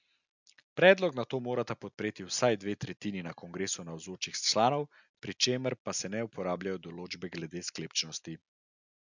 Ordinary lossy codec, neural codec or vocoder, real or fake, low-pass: none; none; real; 7.2 kHz